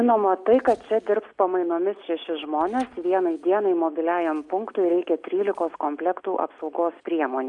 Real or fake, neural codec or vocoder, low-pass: real; none; 10.8 kHz